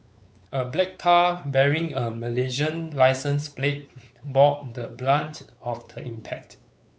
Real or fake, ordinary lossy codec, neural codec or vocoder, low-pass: fake; none; codec, 16 kHz, 4 kbps, X-Codec, WavLM features, trained on Multilingual LibriSpeech; none